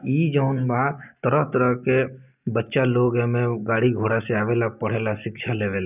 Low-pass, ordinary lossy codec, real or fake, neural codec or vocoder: 3.6 kHz; none; real; none